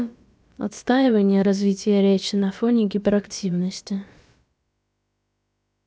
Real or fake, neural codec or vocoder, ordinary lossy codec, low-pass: fake; codec, 16 kHz, about 1 kbps, DyCAST, with the encoder's durations; none; none